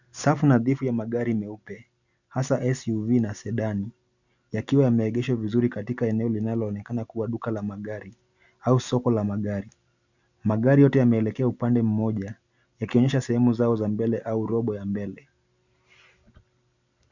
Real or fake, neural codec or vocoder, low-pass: real; none; 7.2 kHz